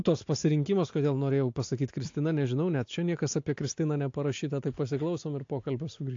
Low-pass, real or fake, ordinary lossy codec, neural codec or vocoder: 7.2 kHz; real; AAC, 48 kbps; none